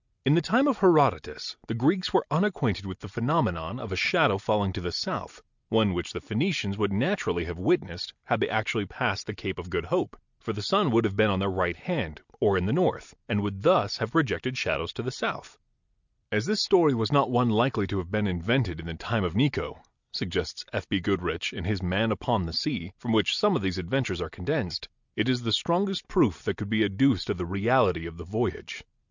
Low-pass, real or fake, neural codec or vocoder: 7.2 kHz; real; none